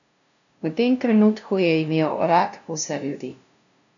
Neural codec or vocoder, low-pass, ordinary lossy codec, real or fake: codec, 16 kHz, 0.5 kbps, FunCodec, trained on LibriTTS, 25 frames a second; 7.2 kHz; none; fake